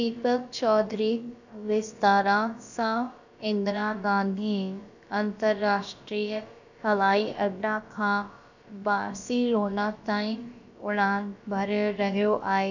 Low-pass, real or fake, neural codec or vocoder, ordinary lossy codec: 7.2 kHz; fake; codec, 16 kHz, about 1 kbps, DyCAST, with the encoder's durations; none